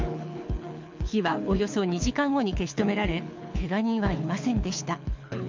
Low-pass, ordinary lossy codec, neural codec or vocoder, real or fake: 7.2 kHz; MP3, 64 kbps; codec, 24 kHz, 6 kbps, HILCodec; fake